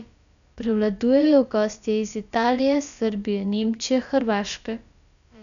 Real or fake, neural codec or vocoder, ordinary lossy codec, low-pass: fake; codec, 16 kHz, about 1 kbps, DyCAST, with the encoder's durations; none; 7.2 kHz